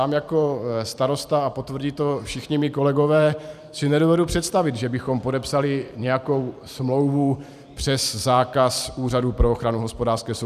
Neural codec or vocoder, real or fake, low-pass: vocoder, 44.1 kHz, 128 mel bands every 512 samples, BigVGAN v2; fake; 14.4 kHz